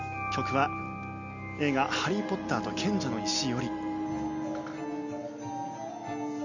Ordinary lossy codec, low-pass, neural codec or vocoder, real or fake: none; 7.2 kHz; none; real